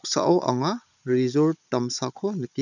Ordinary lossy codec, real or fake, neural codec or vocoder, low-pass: none; fake; codec, 16 kHz, 16 kbps, FunCodec, trained on Chinese and English, 50 frames a second; 7.2 kHz